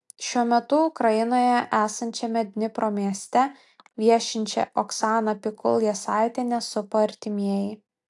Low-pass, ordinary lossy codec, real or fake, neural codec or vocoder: 10.8 kHz; AAC, 64 kbps; real; none